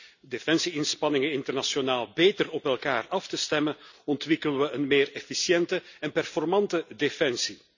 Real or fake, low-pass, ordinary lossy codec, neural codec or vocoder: real; 7.2 kHz; none; none